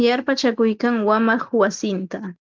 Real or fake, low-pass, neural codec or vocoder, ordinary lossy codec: real; 7.2 kHz; none; Opus, 32 kbps